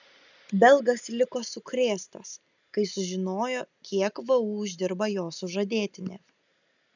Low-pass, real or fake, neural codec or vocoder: 7.2 kHz; real; none